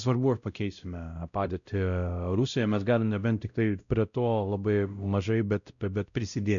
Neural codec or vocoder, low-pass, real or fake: codec, 16 kHz, 0.5 kbps, X-Codec, WavLM features, trained on Multilingual LibriSpeech; 7.2 kHz; fake